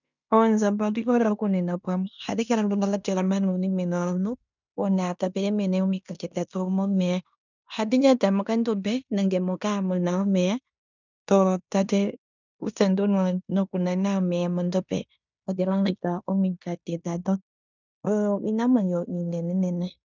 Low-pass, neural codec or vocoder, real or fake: 7.2 kHz; codec, 16 kHz in and 24 kHz out, 0.9 kbps, LongCat-Audio-Codec, fine tuned four codebook decoder; fake